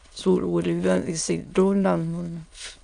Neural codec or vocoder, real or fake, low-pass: autoencoder, 22.05 kHz, a latent of 192 numbers a frame, VITS, trained on many speakers; fake; 9.9 kHz